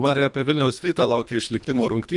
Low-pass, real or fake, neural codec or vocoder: 10.8 kHz; fake; codec, 24 kHz, 1.5 kbps, HILCodec